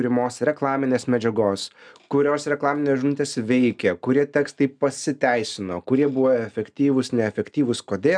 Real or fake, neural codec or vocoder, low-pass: real; none; 9.9 kHz